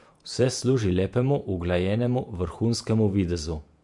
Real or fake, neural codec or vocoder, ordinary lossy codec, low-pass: fake; vocoder, 48 kHz, 128 mel bands, Vocos; MP3, 64 kbps; 10.8 kHz